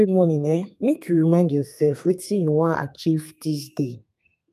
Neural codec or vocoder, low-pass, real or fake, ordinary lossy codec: codec, 32 kHz, 1.9 kbps, SNAC; 14.4 kHz; fake; none